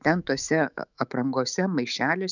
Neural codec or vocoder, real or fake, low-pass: autoencoder, 48 kHz, 128 numbers a frame, DAC-VAE, trained on Japanese speech; fake; 7.2 kHz